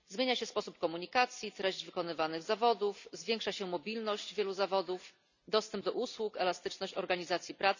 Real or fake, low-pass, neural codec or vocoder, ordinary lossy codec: real; 7.2 kHz; none; none